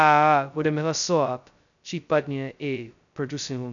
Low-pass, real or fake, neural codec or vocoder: 7.2 kHz; fake; codec, 16 kHz, 0.2 kbps, FocalCodec